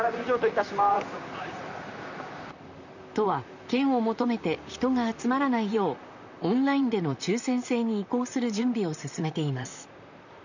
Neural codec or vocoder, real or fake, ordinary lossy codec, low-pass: vocoder, 44.1 kHz, 128 mel bands, Pupu-Vocoder; fake; none; 7.2 kHz